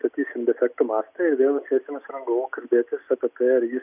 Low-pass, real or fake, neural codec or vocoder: 3.6 kHz; real; none